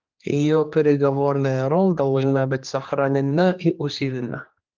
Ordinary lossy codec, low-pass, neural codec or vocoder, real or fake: Opus, 24 kbps; 7.2 kHz; codec, 16 kHz, 2 kbps, X-Codec, HuBERT features, trained on general audio; fake